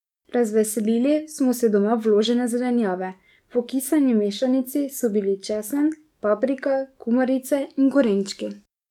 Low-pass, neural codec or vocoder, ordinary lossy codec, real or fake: 19.8 kHz; codec, 44.1 kHz, 7.8 kbps, DAC; none; fake